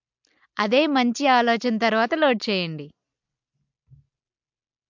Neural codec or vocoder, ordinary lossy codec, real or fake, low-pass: none; MP3, 64 kbps; real; 7.2 kHz